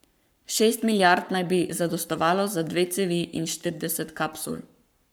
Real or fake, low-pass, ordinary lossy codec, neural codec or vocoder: fake; none; none; codec, 44.1 kHz, 7.8 kbps, Pupu-Codec